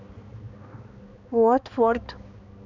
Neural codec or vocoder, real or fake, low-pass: codec, 16 kHz, 2 kbps, X-Codec, HuBERT features, trained on balanced general audio; fake; 7.2 kHz